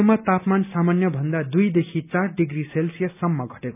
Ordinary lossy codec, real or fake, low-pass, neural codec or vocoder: none; real; 3.6 kHz; none